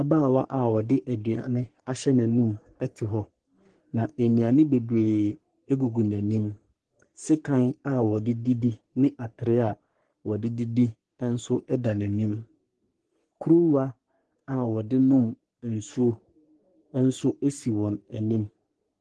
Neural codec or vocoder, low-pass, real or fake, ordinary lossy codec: codec, 44.1 kHz, 3.4 kbps, Pupu-Codec; 10.8 kHz; fake; Opus, 16 kbps